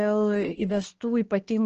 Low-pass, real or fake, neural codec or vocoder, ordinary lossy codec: 7.2 kHz; fake; codec, 16 kHz, 2 kbps, X-Codec, HuBERT features, trained on balanced general audio; Opus, 16 kbps